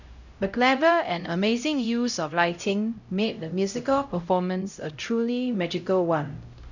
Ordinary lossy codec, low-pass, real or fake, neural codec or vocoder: none; 7.2 kHz; fake; codec, 16 kHz, 0.5 kbps, X-Codec, HuBERT features, trained on LibriSpeech